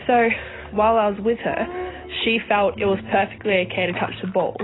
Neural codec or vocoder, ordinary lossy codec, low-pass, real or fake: none; AAC, 16 kbps; 7.2 kHz; real